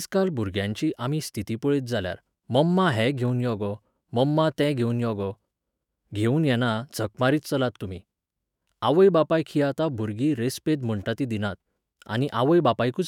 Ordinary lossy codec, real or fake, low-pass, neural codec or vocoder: none; fake; 19.8 kHz; autoencoder, 48 kHz, 128 numbers a frame, DAC-VAE, trained on Japanese speech